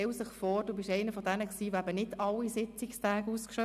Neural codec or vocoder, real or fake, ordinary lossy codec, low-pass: none; real; none; 14.4 kHz